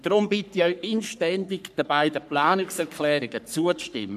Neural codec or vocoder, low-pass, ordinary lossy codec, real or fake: codec, 44.1 kHz, 3.4 kbps, Pupu-Codec; 14.4 kHz; none; fake